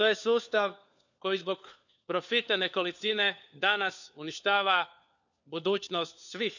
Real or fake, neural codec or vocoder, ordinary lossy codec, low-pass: fake; codec, 16 kHz, 4 kbps, FunCodec, trained on LibriTTS, 50 frames a second; none; 7.2 kHz